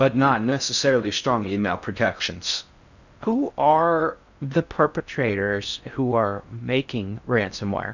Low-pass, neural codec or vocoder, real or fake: 7.2 kHz; codec, 16 kHz in and 24 kHz out, 0.6 kbps, FocalCodec, streaming, 4096 codes; fake